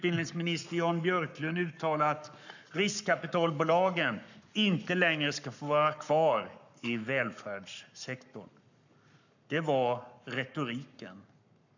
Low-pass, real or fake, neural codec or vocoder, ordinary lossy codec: 7.2 kHz; fake; codec, 44.1 kHz, 7.8 kbps, Pupu-Codec; none